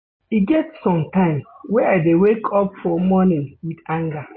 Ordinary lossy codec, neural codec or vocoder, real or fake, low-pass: MP3, 24 kbps; none; real; 7.2 kHz